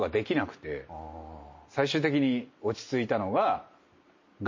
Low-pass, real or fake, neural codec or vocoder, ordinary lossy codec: 7.2 kHz; real; none; MP3, 32 kbps